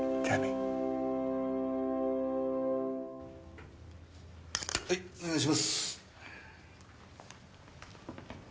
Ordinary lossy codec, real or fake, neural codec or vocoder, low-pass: none; real; none; none